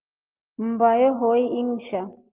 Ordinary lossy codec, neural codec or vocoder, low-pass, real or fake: Opus, 24 kbps; none; 3.6 kHz; real